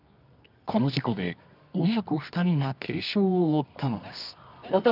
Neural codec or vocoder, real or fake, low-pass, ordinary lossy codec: codec, 24 kHz, 0.9 kbps, WavTokenizer, medium music audio release; fake; 5.4 kHz; none